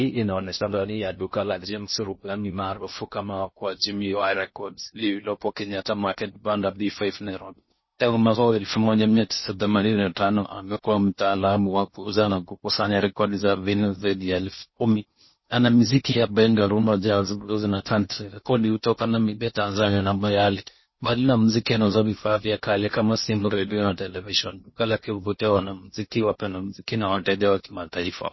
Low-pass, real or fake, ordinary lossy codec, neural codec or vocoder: 7.2 kHz; fake; MP3, 24 kbps; codec, 16 kHz in and 24 kHz out, 0.6 kbps, FocalCodec, streaming, 4096 codes